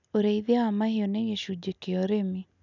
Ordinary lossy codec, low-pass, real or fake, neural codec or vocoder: none; 7.2 kHz; real; none